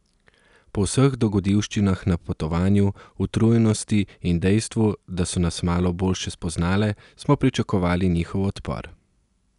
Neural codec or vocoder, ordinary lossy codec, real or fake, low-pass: none; none; real; 10.8 kHz